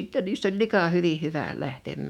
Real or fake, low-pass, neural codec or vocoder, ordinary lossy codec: fake; 19.8 kHz; autoencoder, 48 kHz, 128 numbers a frame, DAC-VAE, trained on Japanese speech; none